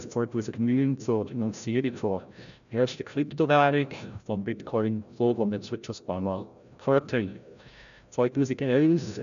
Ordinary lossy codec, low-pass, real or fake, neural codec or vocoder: none; 7.2 kHz; fake; codec, 16 kHz, 0.5 kbps, FreqCodec, larger model